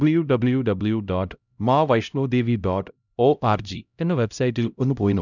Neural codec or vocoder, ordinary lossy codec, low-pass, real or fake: codec, 16 kHz, 0.5 kbps, X-Codec, HuBERT features, trained on LibriSpeech; none; 7.2 kHz; fake